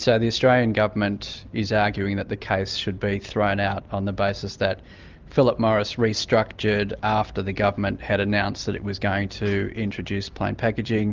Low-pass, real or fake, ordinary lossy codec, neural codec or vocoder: 7.2 kHz; real; Opus, 32 kbps; none